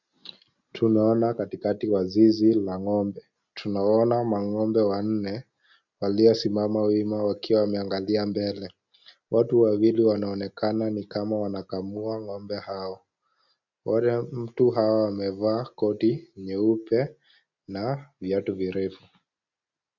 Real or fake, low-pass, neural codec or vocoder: real; 7.2 kHz; none